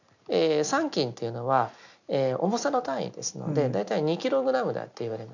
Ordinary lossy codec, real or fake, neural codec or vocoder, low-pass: none; real; none; 7.2 kHz